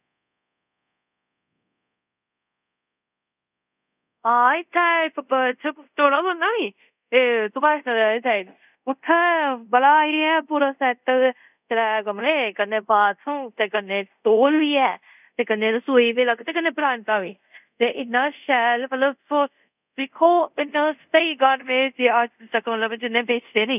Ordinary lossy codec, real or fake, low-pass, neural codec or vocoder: none; fake; 3.6 kHz; codec, 24 kHz, 0.5 kbps, DualCodec